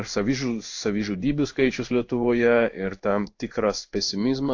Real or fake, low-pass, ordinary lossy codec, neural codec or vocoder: fake; 7.2 kHz; AAC, 48 kbps; codec, 16 kHz in and 24 kHz out, 1 kbps, XY-Tokenizer